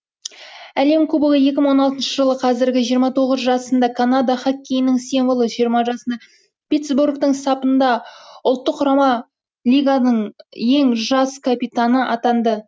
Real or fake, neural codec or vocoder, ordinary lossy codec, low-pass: real; none; none; none